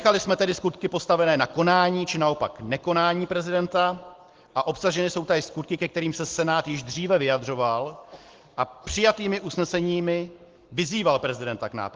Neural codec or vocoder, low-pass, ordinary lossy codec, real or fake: none; 7.2 kHz; Opus, 16 kbps; real